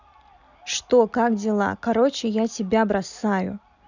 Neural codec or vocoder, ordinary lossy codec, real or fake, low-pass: none; none; real; 7.2 kHz